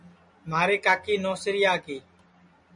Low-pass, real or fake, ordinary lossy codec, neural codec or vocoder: 10.8 kHz; real; MP3, 96 kbps; none